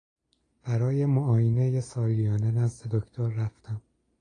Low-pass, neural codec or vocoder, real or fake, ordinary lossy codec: 9.9 kHz; none; real; AAC, 32 kbps